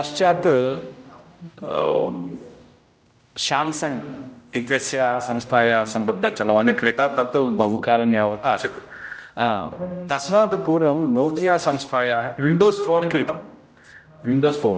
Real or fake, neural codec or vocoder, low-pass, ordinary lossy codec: fake; codec, 16 kHz, 0.5 kbps, X-Codec, HuBERT features, trained on general audio; none; none